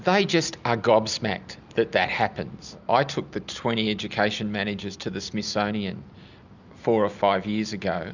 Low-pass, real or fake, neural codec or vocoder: 7.2 kHz; real; none